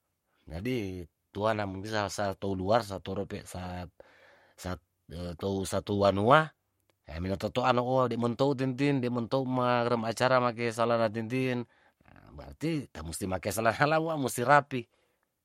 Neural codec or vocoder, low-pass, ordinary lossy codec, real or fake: codec, 44.1 kHz, 7.8 kbps, Pupu-Codec; 19.8 kHz; MP3, 64 kbps; fake